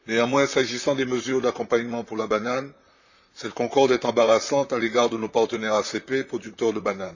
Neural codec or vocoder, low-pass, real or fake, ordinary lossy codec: vocoder, 44.1 kHz, 128 mel bands, Pupu-Vocoder; 7.2 kHz; fake; none